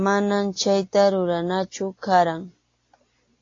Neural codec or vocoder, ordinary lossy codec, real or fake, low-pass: none; AAC, 32 kbps; real; 7.2 kHz